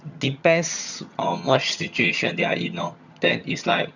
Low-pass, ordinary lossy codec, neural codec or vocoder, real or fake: 7.2 kHz; none; vocoder, 22.05 kHz, 80 mel bands, HiFi-GAN; fake